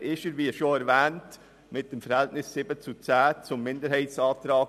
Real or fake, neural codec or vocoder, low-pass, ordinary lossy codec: real; none; 14.4 kHz; none